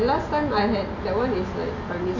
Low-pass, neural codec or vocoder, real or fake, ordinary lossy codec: 7.2 kHz; none; real; none